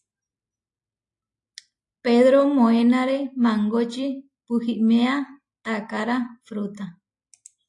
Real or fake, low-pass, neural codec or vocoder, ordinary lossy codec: real; 10.8 kHz; none; AAC, 48 kbps